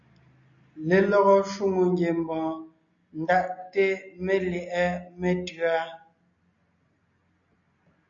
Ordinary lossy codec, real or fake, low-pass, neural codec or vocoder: MP3, 96 kbps; real; 7.2 kHz; none